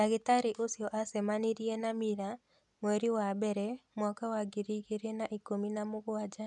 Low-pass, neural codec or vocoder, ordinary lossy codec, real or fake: 10.8 kHz; none; none; real